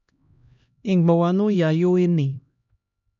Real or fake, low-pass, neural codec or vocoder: fake; 7.2 kHz; codec, 16 kHz, 1 kbps, X-Codec, HuBERT features, trained on LibriSpeech